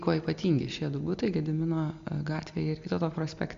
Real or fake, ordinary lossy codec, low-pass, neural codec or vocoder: real; AAC, 64 kbps; 7.2 kHz; none